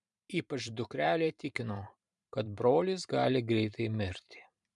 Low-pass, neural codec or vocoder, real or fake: 10.8 kHz; vocoder, 44.1 kHz, 128 mel bands every 512 samples, BigVGAN v2; fake